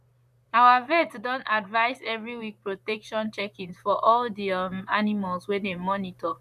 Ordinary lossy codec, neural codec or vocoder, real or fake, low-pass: none; vocoder, 44.1 kHz, 128 mel bands, Pupu-Vocoder; fake; 14.4 kHz